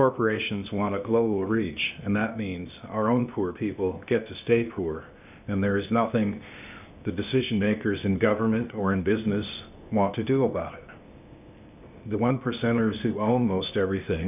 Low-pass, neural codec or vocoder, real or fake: 3.6 kHz; codec, 16 kHz, 0.8 kbps, ZipCodec; fake